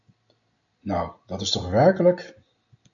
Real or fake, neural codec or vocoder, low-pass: real; none; 7.2 kHz